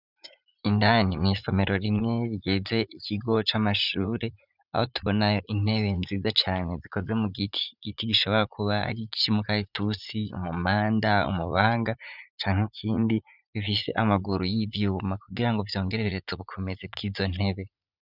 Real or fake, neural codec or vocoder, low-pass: fake; vocoder, 44.1 kHz, 80 mel bands, Vocos; 5.4 kHz